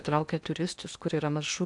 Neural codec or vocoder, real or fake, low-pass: codec, 16 kHz in and 24 kHz out, 0.8 kbps, FocalCodec, streaming, 65536 codes; fake; 10.8 kHz